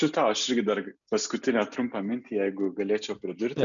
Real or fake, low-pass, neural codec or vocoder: real; 7.2 kHz; none